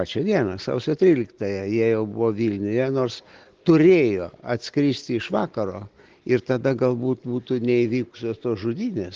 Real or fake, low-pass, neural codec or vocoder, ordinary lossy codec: real; 7.2 kHz; none; Opus, 16 kbps